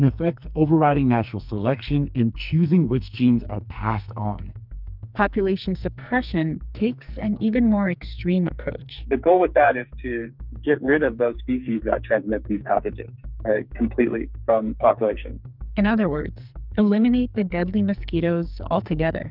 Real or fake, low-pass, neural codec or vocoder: fake; 5.4 kHz; codec, 44.1 kHz, 2.6 kbps, SNAC